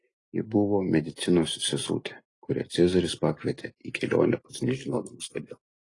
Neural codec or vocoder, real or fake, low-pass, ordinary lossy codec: none; real; 10.8 kHz; AAC, 32 kbps